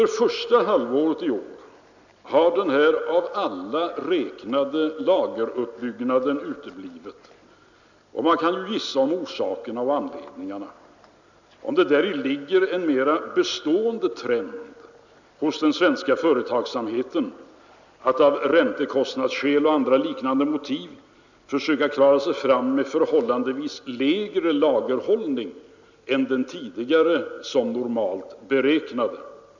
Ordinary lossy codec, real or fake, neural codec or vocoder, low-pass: none; real; none; 7.2 kHz